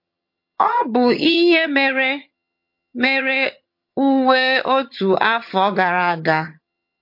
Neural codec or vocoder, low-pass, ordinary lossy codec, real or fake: vocoder, 22.05 kHz, 80 mel bands, HiFi-GAN; 5.4 kHz; MP3, 32 kbps; fake